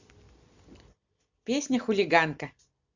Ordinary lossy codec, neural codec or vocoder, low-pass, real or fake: Opus, 64 kbps; none; 7.2 kHz; real